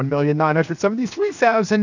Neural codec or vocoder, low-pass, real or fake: codec, 16 kHz, 0.7 kbps, FocalCodec; 7.2 kHz; fake